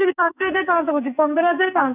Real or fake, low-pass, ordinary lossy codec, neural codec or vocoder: fake; 3.6 kHz; none; codec, 44.1 kHz, 2.6 kbps, SNAC